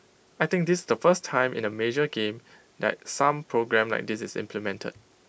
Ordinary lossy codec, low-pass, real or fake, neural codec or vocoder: none; none; real; none